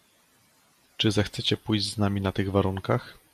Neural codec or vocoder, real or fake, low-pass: none; real; 14.4 kHz